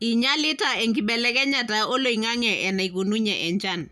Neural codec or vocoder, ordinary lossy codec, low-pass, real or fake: none; none; 14.4 kHz; real